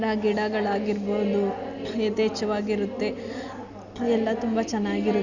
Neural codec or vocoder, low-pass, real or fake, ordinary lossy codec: none; 7.2 kHz; real; none